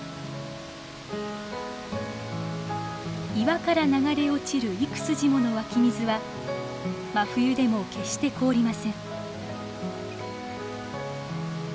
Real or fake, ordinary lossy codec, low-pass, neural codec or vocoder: real; none; none; none